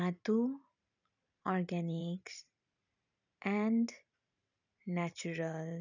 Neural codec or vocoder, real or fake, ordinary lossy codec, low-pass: vocoder, 44.1 kHz, 128 mel bands every 512 samples, BigVGAN v2; fake; none; 7.2 kHz